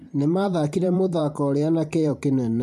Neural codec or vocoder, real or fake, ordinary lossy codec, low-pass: vocoder, 44.1 kHz, 128 mel bands every 512 samples, BigVGAN v2; fake; MP3, 64 kbps; 14.4 kHz